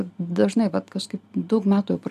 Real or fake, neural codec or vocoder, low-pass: real; none; 14.4 kHz